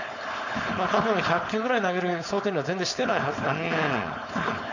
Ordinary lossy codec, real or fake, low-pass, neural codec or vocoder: none; fake; 7.2 kHz; codec, 16 kHz, 4.8 kbps, FACodec